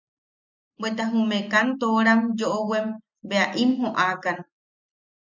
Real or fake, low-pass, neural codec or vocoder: real; 7.2 kHz; none